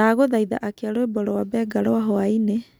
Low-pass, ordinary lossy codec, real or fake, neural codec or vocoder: none; none; real; none